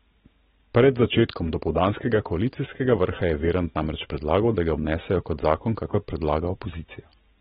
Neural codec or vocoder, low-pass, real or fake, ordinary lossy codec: none; 7.2 kHz; real; AAC, 16 kbps